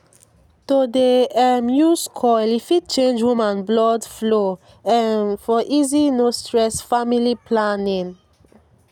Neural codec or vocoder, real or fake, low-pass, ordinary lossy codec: none; real; 19.8 kHz; none